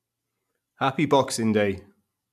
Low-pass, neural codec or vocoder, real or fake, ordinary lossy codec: 14.4 kHz; vocoder, 44.1 kHz, 128 mel bands every 256 samples, BigVGAN v2; fake; none